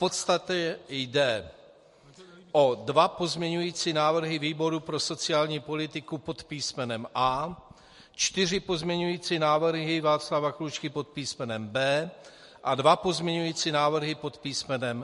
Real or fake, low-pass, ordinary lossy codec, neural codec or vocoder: real; 14.4 kHz; MP3, 48 kbps; none